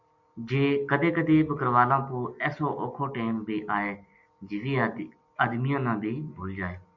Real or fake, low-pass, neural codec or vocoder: real; 7.2 kHz; none